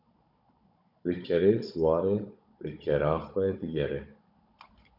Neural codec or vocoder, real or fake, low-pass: codec, 16 kHz, 16 kbps, FunCodec, trained on Chinese and English, 50 frames a second; fake; 5.4 kHz